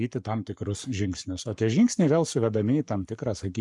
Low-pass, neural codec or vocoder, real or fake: 10.8 kHz; codec, 44.1 kHz, 7.8 kbps, Pupu-Codec; fake